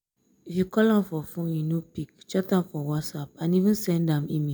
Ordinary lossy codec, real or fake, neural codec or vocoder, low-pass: none; real; none; none